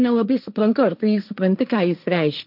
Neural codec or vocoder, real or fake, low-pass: codec, 16 kHz, 1.1 kbps, Voila-Tokenizer; fake; 5.4 kHz